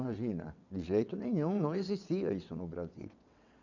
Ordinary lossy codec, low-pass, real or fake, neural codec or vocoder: none; 7.2 kHz; fake; vocoder, 22.05 kHz, 80 mel bands, WaveNeXt